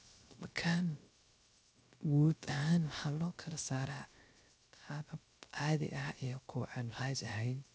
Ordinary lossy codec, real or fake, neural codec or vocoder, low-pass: none; fake; codec, 16 kHz, 0.3 kbps, FocalCodec; none